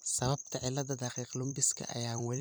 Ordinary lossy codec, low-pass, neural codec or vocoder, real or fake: none; none; vocoder, 44.1 kHz, 128 mel bands every 256 samples, BigVGAN v2; fake